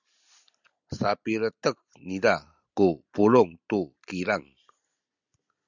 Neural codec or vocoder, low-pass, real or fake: none; 7.2 kHz; real